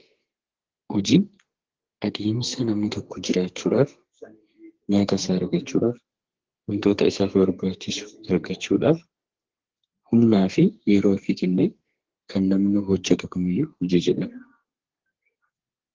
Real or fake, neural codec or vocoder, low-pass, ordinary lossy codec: fake; codec, 32 kHz, 1.9 kbps, SNAC; 7.2 kHz; Opus, 16 kbps